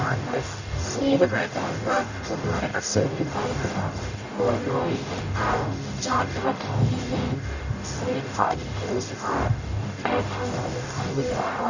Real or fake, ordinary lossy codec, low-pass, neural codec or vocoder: fake; none; 7.2 kHz; codec, 44.1 kHz, 0.9 kbps, DAC